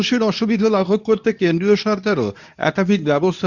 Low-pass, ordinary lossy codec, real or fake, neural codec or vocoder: 7.2 kHz; none; fake; codec, 24 kHz, 0.9 kbps, WavTokenizer, medium speech release version 2